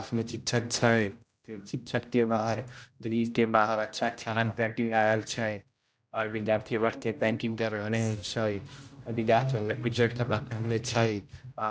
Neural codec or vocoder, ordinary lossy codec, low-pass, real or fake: codec, 16 kHz, 0.5 kbps, X-Codec, HuBERT features, trained on general audio; none; none; fake